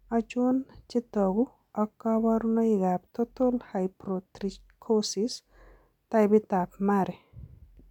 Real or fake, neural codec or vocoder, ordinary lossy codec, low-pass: real; none; none; 19.8 kHz